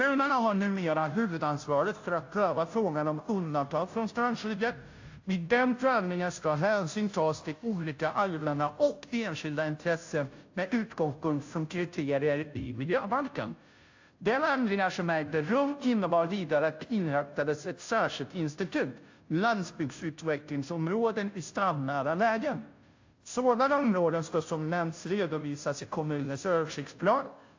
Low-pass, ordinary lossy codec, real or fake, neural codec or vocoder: 7.2 kHz; AAC, 48 kbps; fake; codec, 16 kHz, 0.5 kbps, FunCodec, trained on Chinese and English, 25 frames a second